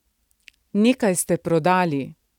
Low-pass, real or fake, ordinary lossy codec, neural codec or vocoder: 19.8 kHz; real; none; none